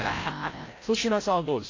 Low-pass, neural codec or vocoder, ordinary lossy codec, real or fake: 7.2 kHz; codec, 16 kHz, 0.5 kbps, FreqCodec, larger model; AAC, 32 kbps; fake